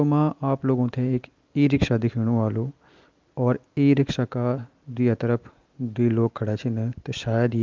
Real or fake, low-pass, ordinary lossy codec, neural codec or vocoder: real; 7.2 kHz; Opus, 24 kbps; none